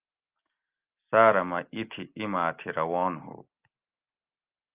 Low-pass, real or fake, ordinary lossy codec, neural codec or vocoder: 3.6 kHz; real; Opus, 24 kbps; none